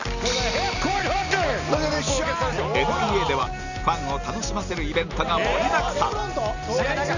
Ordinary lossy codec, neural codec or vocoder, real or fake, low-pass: none; none; real; 7.2 kHz